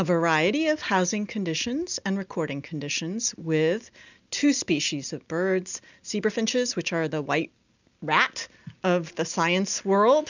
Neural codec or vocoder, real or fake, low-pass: none; real; 7.2 kHz